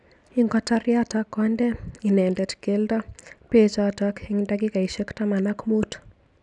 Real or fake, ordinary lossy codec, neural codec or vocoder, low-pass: real; none; none; 10.8 kHz